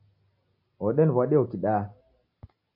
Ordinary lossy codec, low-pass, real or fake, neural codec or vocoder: MP3, 48 kbps; 5.4 kHz; real; none